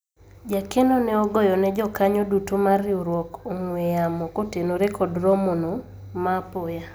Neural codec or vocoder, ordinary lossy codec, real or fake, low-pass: none; none; real; none